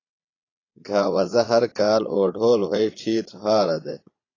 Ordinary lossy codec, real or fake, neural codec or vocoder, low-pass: AAC, 32 kbps; fake; vocoder, 22.05 kHz, 80 mel bands, Vocos; 7.2 kHz